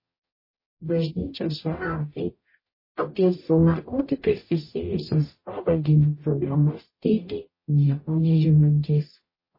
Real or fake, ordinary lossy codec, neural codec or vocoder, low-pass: fake; MP3, 24 kbps; codec, 44.1 kHz, 0.9 kbps, DAC; 5.4 kHz